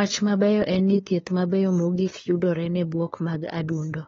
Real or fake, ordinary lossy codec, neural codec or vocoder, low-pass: fake; AAC, 24 kbps; codec, 16 kHz, 2 kbps, FunCodec, trained on LibriTTS, 25 frames a second; 7.2 kHz